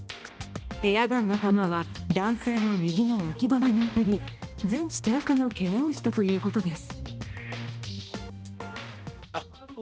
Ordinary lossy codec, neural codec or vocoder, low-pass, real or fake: none; codec, 16 kHz, 1 kbps, X-Codec, HuBERT features, trained on balanced general audio; none; fake